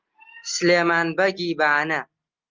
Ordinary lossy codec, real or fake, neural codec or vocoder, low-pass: Opus, 24 kbps; real; none; 7.2 kHz